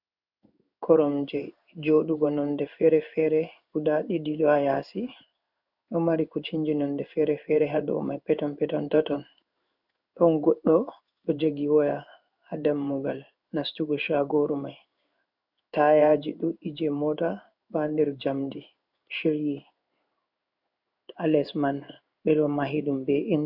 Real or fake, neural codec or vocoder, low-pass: fake; codec, 16 kHz in and 24 kHz out, 1 kbps, XY-Tokenizer; 5.4 kHz